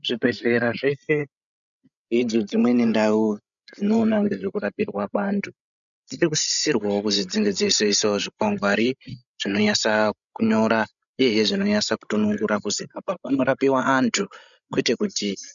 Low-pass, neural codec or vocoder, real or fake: 7.2 kHz; codec, 16 kHz, 16 kbps, FreqCodec, larger model; fake